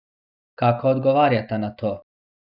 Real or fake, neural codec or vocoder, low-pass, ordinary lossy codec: real; none; 5.4 kHz; none